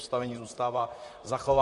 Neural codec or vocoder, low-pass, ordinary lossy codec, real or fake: vocoder, 44.1 kHz, 128 mel bands, Pupu-Vocoder; 14.4 kHz; MP3, 48 kbps; fake